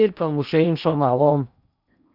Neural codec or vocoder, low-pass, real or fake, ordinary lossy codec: codec, 16 kHz in and 24 kHz out, 0.8 kbps, FocalCodec, streaming, 65536 codes; 5.4 kHz; fake; Opus, 64 kbps